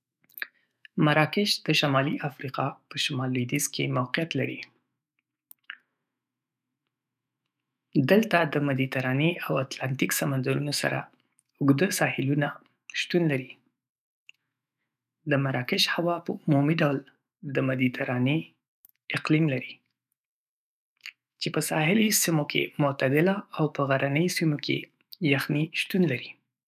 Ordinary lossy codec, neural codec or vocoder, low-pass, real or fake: none; autoencoder, 48 kHz, 128 numbers a frame, DAC-VAE, trained on Japanese speech; 14.4 kHz; fake